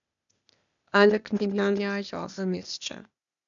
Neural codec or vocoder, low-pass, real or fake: codec, 16 kHz, 0.8 kbps, ZipCodec; 7.2 kHz; fake